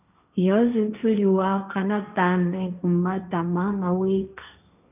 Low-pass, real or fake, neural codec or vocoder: 3.6 kHz; fake; codec, 16 kHz, 1.1 kbps, Voila-Tokenizer